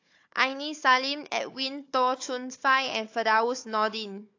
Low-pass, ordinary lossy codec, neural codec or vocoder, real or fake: 7.2 kHz; AAC, 48 kbps; codec, 16 kHz, 4 kbps, FunCodec, trained on Chinese and English, 50 frames a second; fake